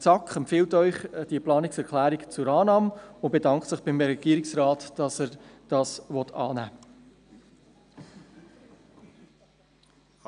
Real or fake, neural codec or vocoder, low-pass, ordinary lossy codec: real; none; 9.9 kHz; none